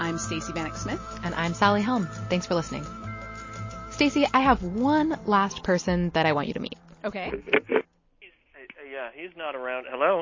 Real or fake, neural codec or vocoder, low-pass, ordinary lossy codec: real; none; 7.2 kHz; MP3, 32 kbps